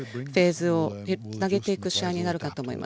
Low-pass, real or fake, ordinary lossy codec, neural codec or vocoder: none; real; none; none